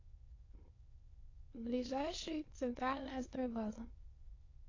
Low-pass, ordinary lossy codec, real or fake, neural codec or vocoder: 7.2 kHz; AAC, 32 kbps; fake; autoencoder, 22.05 kHz, a latent of 192 numbers a frame, VITS, trained on many speakers